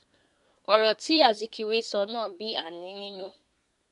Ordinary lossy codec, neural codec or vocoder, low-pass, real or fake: none; codec, 24 kHz, 1 kbps, SNAC; 10.8 kHz; fake